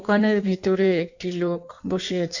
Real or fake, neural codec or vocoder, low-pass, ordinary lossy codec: fake; codec, 16 kHz in and 24 kHz out, 1.1 kbps, FireRedTTS-2 codec; 7.2 kHz; none